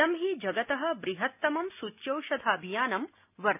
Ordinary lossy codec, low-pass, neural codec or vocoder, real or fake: none; 3.6 kHz; none; real